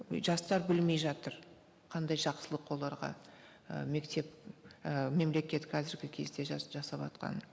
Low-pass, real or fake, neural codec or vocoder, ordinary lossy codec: none; real; none; none